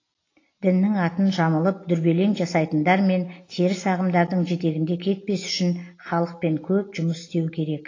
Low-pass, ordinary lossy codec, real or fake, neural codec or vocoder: 7.2 kHz; AAC, 32 kbps; real; none